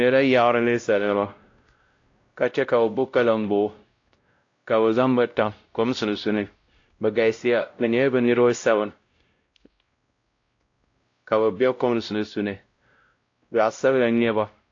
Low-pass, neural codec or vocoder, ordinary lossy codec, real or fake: 7.2 kHz; codec, 16 kHz, 0.5 kbps, X-Codec, WavLM features, trained on Multilingual LibriSpeech; AAC, 48 kbps; fake